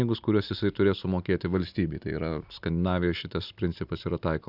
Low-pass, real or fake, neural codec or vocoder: 5.4 kHz; real; none